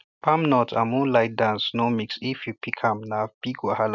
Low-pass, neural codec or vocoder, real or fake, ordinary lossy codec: 7.2 kHz; none; real; none